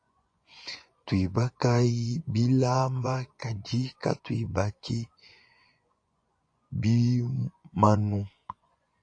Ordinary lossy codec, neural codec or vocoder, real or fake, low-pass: AAC, 48 kbps; vocoder, 24 kHz, 100 mel bands, Vocos; fake; 9.9 kHz